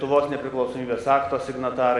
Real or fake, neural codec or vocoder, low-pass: real; none; 10.8 kHz